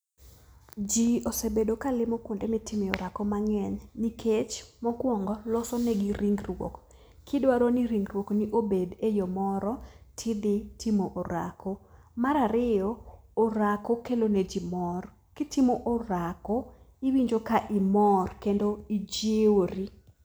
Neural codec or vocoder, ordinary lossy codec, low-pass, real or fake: none; none; none; real